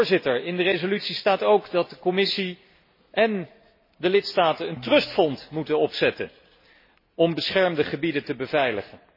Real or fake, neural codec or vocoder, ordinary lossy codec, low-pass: real; none; MP3, 24 kbps; 5.4 kHz